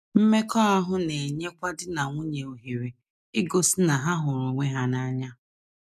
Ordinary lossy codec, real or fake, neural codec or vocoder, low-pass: none; real; none; 14.4 kHz